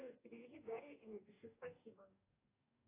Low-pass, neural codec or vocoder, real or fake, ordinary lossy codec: 3.6 kHz; codec, 44.1 kHz, 2.6 kbps, DAC; fake; AAC, 24 kbps